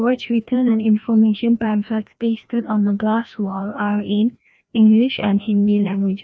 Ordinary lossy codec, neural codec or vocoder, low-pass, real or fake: none; codec, 16 kHz, 1 kbps, FreqCodec, larger model; none; fake